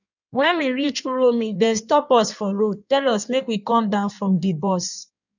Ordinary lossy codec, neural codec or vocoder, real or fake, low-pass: none; codec, 16 kHz in and 24 kHz out, 1.1 kbps, FireRedTTS-2 codec; fake; 7.2 kHz